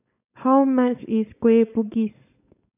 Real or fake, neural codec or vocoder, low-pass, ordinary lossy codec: fake; codec, 16 kHz, 4.8 kbps, FACodec; 3.6 kHz; none